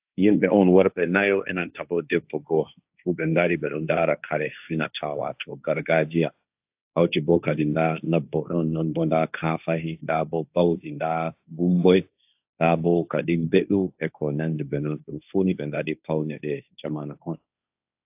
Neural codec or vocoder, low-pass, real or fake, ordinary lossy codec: codec, 16 kHz, 1.1 kbps, Voila-Tokenizer; 3.6 kHz; fake; AAC, 32 kbps